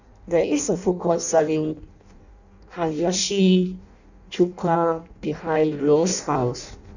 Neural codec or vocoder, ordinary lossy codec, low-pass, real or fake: codec, 16 kHz in and 24 kHz out, 0.6 kbps, FireRedTTS-2 codec; none; 7.2 kHz; fake